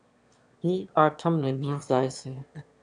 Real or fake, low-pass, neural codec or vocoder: fake; 9.9 kHz; autoencoder, 22.05 kHz, a latent of 192 numbers a frame, VITS, trained on one speaker